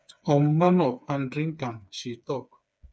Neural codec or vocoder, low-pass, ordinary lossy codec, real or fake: codec, 16 kHz, 4 kbps, FreqCodec, smaller model; none; none; fake